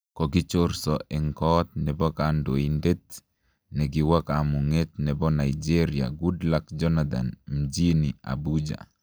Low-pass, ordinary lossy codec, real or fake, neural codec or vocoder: none; none; real; none